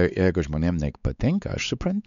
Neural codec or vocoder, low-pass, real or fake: codec, 16 kHz, 4 kbps, X-Codec, WavLM features, trained on Multilingual LibriSpeech; 7.2 kHz; fake